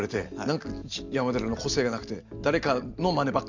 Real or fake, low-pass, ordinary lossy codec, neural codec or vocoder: real; 7.2 kHz; none; none